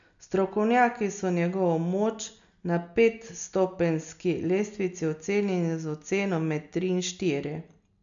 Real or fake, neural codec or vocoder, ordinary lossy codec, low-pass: real; none; none; 7.2 kHz